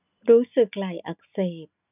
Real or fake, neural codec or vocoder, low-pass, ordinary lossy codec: real; none; 3.6 kHz; none